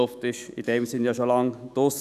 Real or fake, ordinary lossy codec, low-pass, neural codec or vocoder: fake; none; 14.4 kHz; autoencoder, 48 kHz, 128 numbers a frame, DAC-VAE, trained on Japanese speech